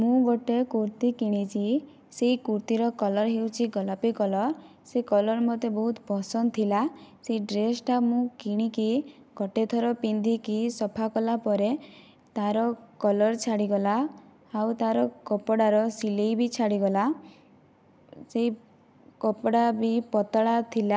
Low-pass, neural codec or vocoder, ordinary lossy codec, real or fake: none; none; none; real